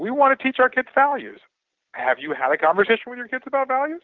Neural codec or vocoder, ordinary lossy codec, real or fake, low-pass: none; Opus, 16 kbps; real; 7.2 kHz